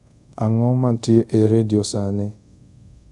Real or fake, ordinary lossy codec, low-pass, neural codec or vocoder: fake; none; 10.8 kHz; codec, 24 kHz, 0.5 kbps, DualCodec